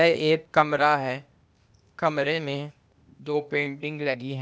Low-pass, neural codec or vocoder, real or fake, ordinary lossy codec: none; codec, 16 kHz, 0.8 kbps, ZipCodec; fake; none